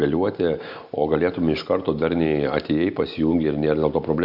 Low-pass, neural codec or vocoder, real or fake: 5.4 kHz; none; real